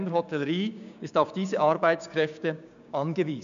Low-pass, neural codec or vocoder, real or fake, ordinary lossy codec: 7.2 kHz; codec, 16 kHz, 6 kbps, DAC; fake; AAC, 96 kbps